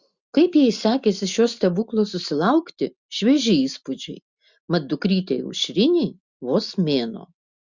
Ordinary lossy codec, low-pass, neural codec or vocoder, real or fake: Opus, 64 kbps; 7.2 kHz; none; real